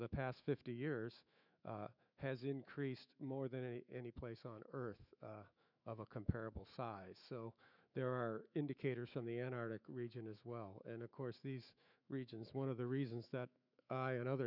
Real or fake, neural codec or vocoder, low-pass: fake; autoencoder, 48 kHz, 128 numbers a frame, DAC-VAE, trained on Japanese speech; 5.4 kHz